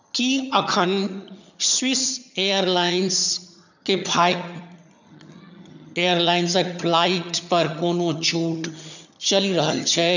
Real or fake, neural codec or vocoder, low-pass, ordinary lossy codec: fake; vocoder, 22.05 kHz, 80 mel bands, HiFi-GAN; 7.2 kHz; none